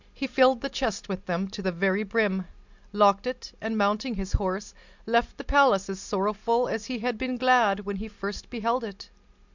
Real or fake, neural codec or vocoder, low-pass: real; none; 7.2 kHz